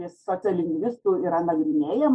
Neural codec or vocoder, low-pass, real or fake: none; 9.9 kHz; real